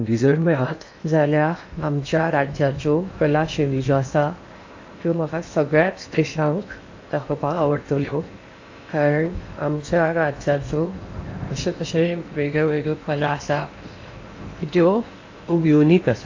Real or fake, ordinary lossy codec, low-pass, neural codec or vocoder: fake; AAC, 48 kbps; 7.2 kHz; codec, 16 kHz in and 24 kHz out, 0.8 kbps, FocalCodec, streaming, 65536 codes